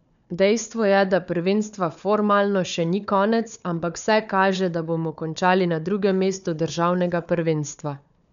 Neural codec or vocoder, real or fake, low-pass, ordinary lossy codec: codec, 16 kHz, 4 kbps, FunCodec, trained on Chinese and English, 50 frames a second; fake; 7.2 kHz; none